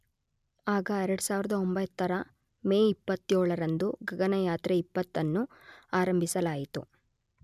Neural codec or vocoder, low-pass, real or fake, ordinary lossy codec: none; 14.4 kHz; real; none